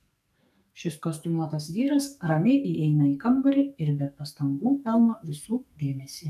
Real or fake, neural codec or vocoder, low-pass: fake; codec, 44.1 kHz, 2.6 kbps, SNAC; 14.4 kHz